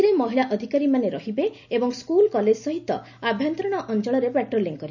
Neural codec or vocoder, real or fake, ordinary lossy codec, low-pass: none; real; none; 7.2 kHz